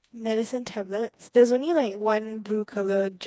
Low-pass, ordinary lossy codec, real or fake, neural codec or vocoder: none; none; fake; codec, 16 kHz, 2 kbps, FreqCodec, smaller model